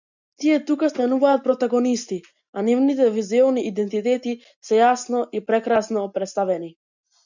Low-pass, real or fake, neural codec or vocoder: 7.2 kHz; real; none